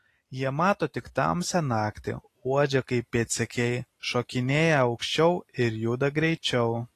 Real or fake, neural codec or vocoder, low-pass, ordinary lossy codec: real; none; 14.4 kHz; AAC, 48 kbps